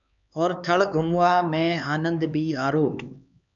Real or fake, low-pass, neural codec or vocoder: fake; 7.2 kHz; codec, 16 kHz, 4 kbps, X-Codec, HuBERT features, trained on LibriSpeech